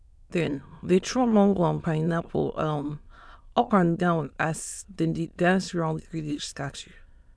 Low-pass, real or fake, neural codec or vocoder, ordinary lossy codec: none; fake; autoencoder, 22.05 kHz, a latent of 192 numbers a frame, VITS, trained on many speakers; none